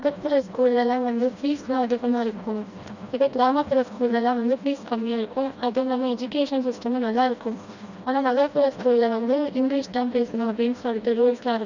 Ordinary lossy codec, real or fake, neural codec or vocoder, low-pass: none; fake; codec, 16 kHz, 1 kbps, FreqCodec, smaller model; 7.2 kHz